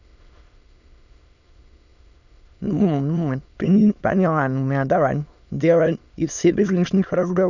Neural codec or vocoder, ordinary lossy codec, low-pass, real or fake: autoencoder, 22.05 kHz, a latent of 192 numbers a frame, VITS, trained on many speakers; none; 7.2 kHz; fake